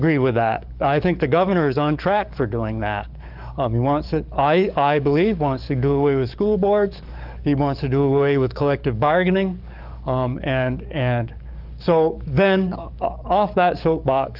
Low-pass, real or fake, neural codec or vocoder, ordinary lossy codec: 5.4 kHz; fake; codec, 44.1 kHz, 7.8 kbps, DAC; Opus, 24 kbps